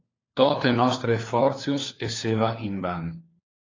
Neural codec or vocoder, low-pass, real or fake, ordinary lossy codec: codec, 16 kHz, 4 kbps, FunCodec, trained on LibriTTS, 50 frames a second; 7.2 kHz; fake; AAC, 32 kbps